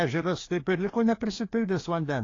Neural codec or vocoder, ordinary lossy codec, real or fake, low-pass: codec, 16 kHz, 2 kbps, FreqCodec, larger model; AAC, 32 kbps; fake; 7.2 kHz